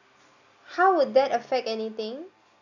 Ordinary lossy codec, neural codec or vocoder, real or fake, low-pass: none; none; real; 7.2 kHz